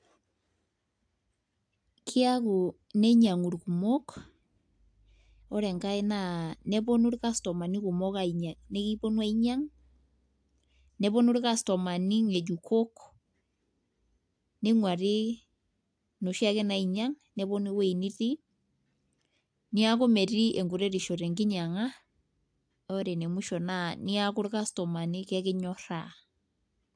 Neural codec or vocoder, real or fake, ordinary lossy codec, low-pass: none; real; AAC, 64 kbps; 9.9 kHz